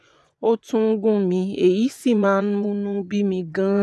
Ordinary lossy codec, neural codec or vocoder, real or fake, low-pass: none; vocoder, 24 kHz, 100 mel bands, Vocos; fake; none